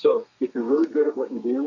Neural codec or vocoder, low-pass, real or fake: codec, 32 kHz, 1.9 kbps, SNAC; 7.2 kHz; fake